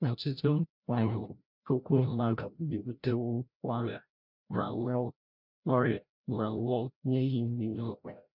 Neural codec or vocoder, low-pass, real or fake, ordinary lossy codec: codec, 16 kHz, 0.5 kbps, FreqCodec, larger model; 5.4 kHz; fake; none